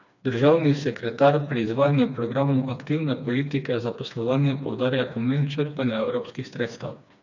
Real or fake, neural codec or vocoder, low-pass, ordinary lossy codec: fake; codec, 16 kHz, 2 kbps, FreqCodec, smaller model; 7.2 kHz; none